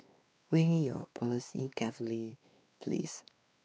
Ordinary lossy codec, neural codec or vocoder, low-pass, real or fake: none; codec, 16 kHz, 2 kbps, X-Codec, WavLM features, trained on Multilingual LibriSpeech; none; fake